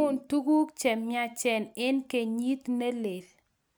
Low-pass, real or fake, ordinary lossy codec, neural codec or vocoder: none; real; none; none